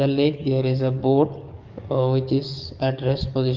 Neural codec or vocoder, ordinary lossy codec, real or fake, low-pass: codec, 44.1 kHz, 7.8 kbps, DAC; Opus, 24 kbps; fake; 7.2 kHz